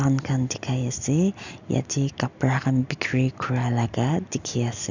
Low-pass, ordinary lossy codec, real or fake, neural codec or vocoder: 7.2 kHz; none; real; none